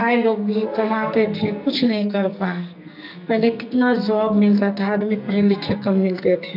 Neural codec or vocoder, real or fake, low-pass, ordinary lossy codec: codec, 44.1 kHz, 2.6 kbps, SNAC; fake; 5.4 kHz; none